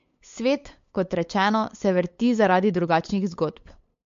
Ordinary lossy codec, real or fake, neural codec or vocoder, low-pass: MP3, 48 kbps; fake; codec, 16 kHz, 8 kbps, FunCodec, trained on LibriTTS, 25 frames a second; 7.2 kHz